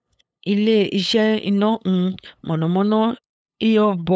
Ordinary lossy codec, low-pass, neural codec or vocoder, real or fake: none; none; codec, 16 kHz, 8 kbps, FunCodec, trained on LibriTTS, 25 frames a second; fake